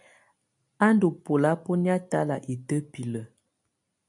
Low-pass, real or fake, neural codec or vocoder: 10.8 kHz; real; none